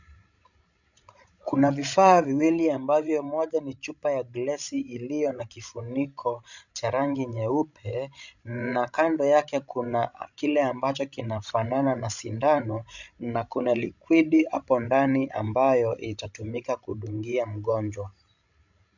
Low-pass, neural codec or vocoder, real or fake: 7.2 kHz; codec, 16 kHz, 16 kbps, FreqCodec, larger model; fake